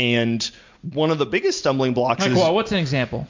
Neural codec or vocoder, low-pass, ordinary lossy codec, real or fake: none; 7.2 kHz; MP3, 64 kbps; real